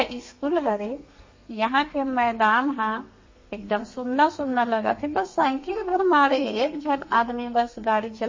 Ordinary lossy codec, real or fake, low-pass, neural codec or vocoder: MP3, 32 kbps; fake; 7.2 kHz; codec, 32 kHz, 1.9 kbps, SNAC